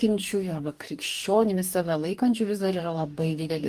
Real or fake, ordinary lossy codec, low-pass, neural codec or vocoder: fake; Opus, 32 kbps; 14.4 kHz; codec, 44.1 kHz, 2.6 kbps, DAC